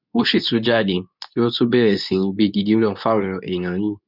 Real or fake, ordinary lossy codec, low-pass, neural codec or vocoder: fake; none; 5.4 kHz; codec, 24 kHz, 0.9 kbps, WavTokenizer, medium speech release version 2